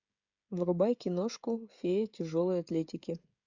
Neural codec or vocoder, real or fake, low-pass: codec, 16 kHz, 16 kbps, FreqCodec, smaller model; fake; 7.2 kHz